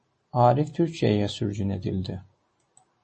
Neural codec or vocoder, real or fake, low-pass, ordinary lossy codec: none; real; 10.8 kHz; MP3, 32 kbps